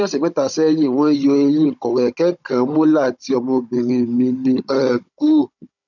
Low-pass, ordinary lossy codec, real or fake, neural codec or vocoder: 7.2 kHz; none; fake; codec, 16 kHz, 16 kbps, FunCodec, trained on Chinese and English, 50 frames a second